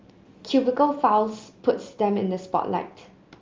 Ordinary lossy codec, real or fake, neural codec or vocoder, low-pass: Opus, 32 kbps; real; none; 7.2 kHz